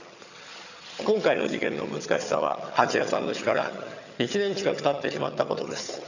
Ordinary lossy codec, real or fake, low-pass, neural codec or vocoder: none; fake; 7.2 kHz; vocoder, 22.05 kHz, 80 mel bands, HiFi-GAN